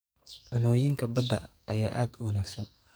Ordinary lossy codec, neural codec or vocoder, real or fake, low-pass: none; codec, 44.1 kHz, 2.6 kbps, SNAC; fake; none